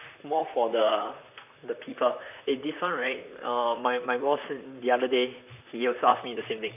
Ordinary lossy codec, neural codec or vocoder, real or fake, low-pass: none; vocoder, 44.1 kHz, 128 mel bands, Pupu-Vocoder; fake; 3.6 kHz